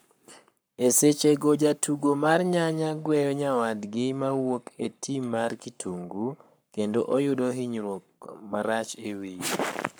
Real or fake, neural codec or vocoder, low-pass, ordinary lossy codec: fake; codec, 44.1 kHz, 7.8 kbps, Pupu-Codec; none; none